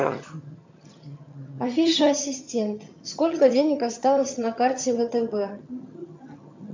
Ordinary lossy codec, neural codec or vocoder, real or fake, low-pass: MP3, 64 kbps; vocoder, 22.05 kHz, 80 mel bands, HiFi-GAN; fake; 7.2 kHz